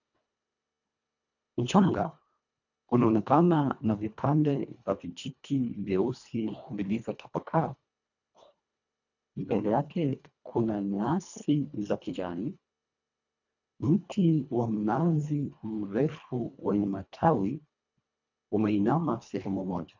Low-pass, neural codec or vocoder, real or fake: 7.2 kHz; codec, 24 kHz, 1.5 kbps, HILCodec; fake